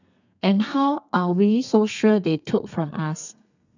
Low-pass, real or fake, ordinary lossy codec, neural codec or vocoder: 7.2 kHz; fake; none; codec, 32 kHz, 1.9 kbps, SNAC